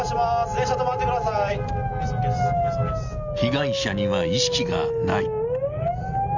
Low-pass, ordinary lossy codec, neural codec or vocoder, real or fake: 7.2 kHz; none; none; real